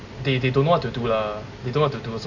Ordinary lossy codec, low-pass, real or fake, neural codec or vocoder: none; 7.2 kHz; real; none